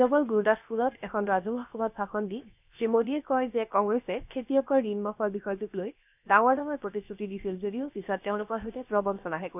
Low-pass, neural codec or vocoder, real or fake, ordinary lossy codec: 3.6 kHz; codec, 16 kHz, 0.7 kbps, FocalCodec; fake; none